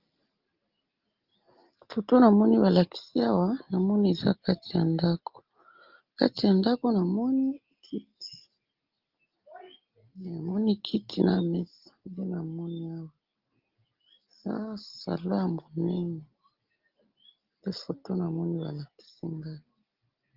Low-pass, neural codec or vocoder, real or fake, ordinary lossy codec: 5.4 kHz; none; real; Opus, 32 kbps